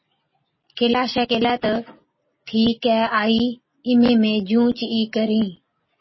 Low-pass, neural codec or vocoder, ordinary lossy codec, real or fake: 7.2 kHz; none; MP3, 24 kbps; real